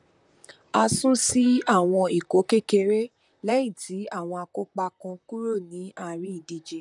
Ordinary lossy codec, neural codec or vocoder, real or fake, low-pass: none; vocoder, 44.1 kHz, 128 mel bands, Pupu-Vocoder; fake; 10.8 kHz